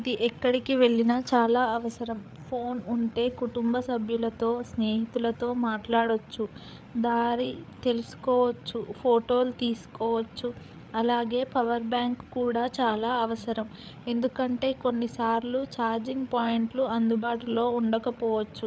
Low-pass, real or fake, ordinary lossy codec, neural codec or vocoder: none; fake; none; codec, 16 kHz, 8 kbps, FreqCodec, larger model